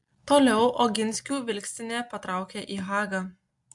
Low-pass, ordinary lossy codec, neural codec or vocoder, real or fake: 10.8 kHz; MP3, 64 kbps; none; real